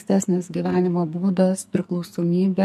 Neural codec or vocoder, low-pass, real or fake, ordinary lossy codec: codec, 44.1 kHz, 2.6 kbps, SNAC; 14.4 kHz; fake; MP3, 64 kbps